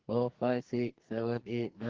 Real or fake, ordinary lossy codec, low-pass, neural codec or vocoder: fake; Opus, 16 kbps; 7.2 kHz; codec, 44.1 kHz, 2.6 kbps, SNAC